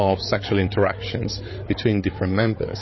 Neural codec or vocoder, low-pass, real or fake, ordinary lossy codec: codec, 16 kHz, 8 kbps, FreqCodec, larger model; 7.2 kHz; fake; MP3, 24 kbps